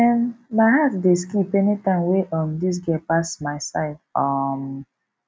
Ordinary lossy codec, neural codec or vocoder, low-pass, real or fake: none; none; none; real